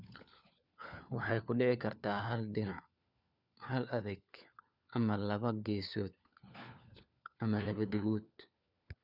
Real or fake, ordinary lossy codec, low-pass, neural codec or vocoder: fake; none; 5.4 kHz; codec, 16 kHz, 4 kbps, FunCodec, trained on LibriTTS, 50 frames a second